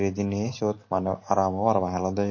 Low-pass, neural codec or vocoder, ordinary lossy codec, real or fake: 7.2 kHz; none; MP3, 32 kbps; real